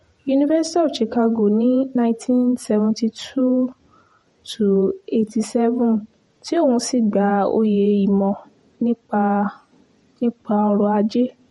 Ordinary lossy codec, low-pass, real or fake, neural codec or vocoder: MP3, 48 kbps; 19.8 kHz; fake; vocoder, 48 kHz, 128 mel bands, Vocos